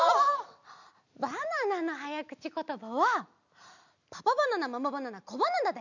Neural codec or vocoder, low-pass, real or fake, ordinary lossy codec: none; 7.2 kHz; real; none